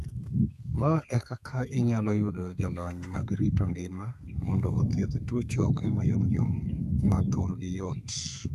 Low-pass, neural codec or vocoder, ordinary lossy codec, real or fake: 14.4 kHz; codec, 32 kHz, 1.9 kbps, SNAC; none; fake